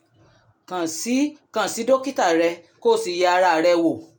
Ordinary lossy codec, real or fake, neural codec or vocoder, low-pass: none; real; none; none